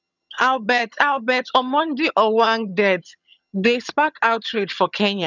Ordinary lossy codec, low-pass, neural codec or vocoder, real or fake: none; 7.2 kHz; vocoder, 22.05 kHz, 80 mel bands, HiFi-GAN; fake